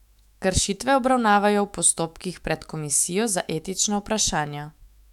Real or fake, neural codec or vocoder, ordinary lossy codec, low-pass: fake; autoencoder, 48 kHz, 128 numbers a frame, DAC-VAE, trained on Japanese speech; none; 19.8 kHz